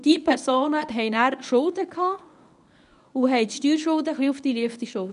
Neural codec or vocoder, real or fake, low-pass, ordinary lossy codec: codec, 24 kHz, 0.9 kbps, WavTokenizer, medium speech release version 1; fake; 10.8 kHz; none